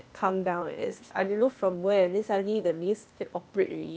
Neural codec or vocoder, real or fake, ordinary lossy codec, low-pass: codec, 16 kHz, 0.8 kbps, ZipCodec; fake; none; none